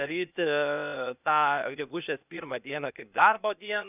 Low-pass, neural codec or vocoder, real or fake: 3.6 kHz; codec, 16 kHz, 0.8 kbps, ZipCodec; fake